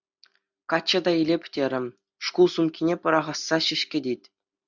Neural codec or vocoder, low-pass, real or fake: none; 7.2 kHz; real